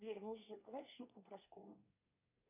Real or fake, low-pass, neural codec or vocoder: fake; 3.6 kHz; codec, 24 kHz, 1 kbps, SNAC